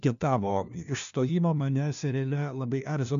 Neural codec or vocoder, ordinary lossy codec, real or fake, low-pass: codec, 16 kHz, 1 kbps, FunCodec, trained on LibriTTS, 50 frames a second; MP3, 64 kbps; fake; 7.2 kHz